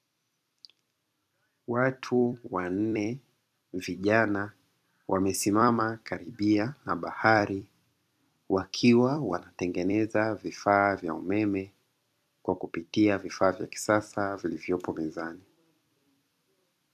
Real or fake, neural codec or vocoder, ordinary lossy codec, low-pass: fake; vocoder, 44.1 kHz, 128 mel bands every 256 samples, BigVGAN v2; MP3, 96 kbps; 14.4 kHz